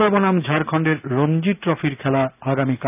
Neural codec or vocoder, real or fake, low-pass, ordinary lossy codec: none; real; 3.6 kHz; none